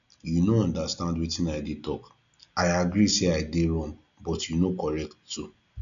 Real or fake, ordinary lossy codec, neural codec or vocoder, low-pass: real; none; none; 7.2 kHz